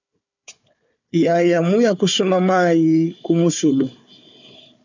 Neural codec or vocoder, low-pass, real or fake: codec, 16 kHz, 4 kbps, FunCodec, trained on Chinese and English, 50 frames a second; 7.2 kHz; fake